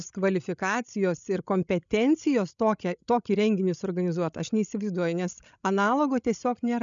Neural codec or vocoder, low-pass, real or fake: codec, 16 kHz, 16 kbps, FreqCodec, larger model; 7.2 kHz; fake